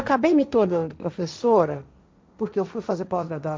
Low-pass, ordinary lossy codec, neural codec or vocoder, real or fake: none; none; codec, 16 kHz, 1.1 kbps, Voila-Tokenizer; fake